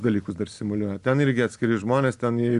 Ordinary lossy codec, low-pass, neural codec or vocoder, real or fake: MP3, 96 kbps; 10.8 kHz; none; real